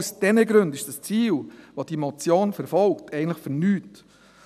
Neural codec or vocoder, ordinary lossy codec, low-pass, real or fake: none; none; 14.4 kHz; real